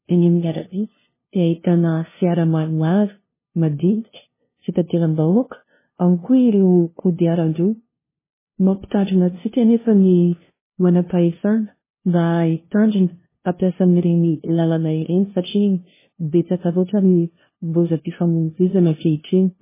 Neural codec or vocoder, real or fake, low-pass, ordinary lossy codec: codec, 16 kHz, 0.5 kbps, FunCodec, trained on LibriTTS, 25 frames a second; fake; 3.6 kHz; MP3, 16 kbps